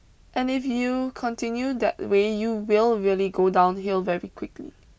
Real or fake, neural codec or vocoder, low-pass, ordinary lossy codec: real; none; none; none